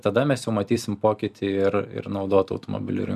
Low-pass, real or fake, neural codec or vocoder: 14.4 kHz; fake; vocoder, 44.1 kHz, 128 mel bands every 256 samples, BigVGAN v2